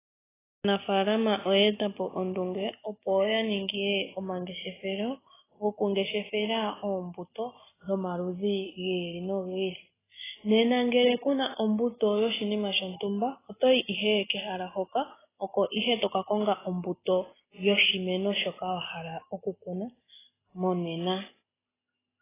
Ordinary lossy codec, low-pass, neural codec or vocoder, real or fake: AAC, 16 kbps; 3.6 kHz; none; real